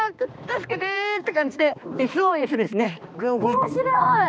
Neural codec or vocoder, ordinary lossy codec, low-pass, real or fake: codec, 16 kHz, 2 kbps, X-Codec, HuBERT features, trained on balanced general audio; none; none; fake